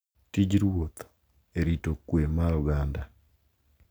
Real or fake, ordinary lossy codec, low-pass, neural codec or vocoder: real; none; none; none